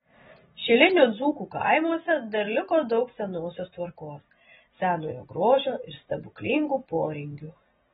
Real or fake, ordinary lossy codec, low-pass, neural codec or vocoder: real; AAC, 16 kbps; 19.8 kHz; none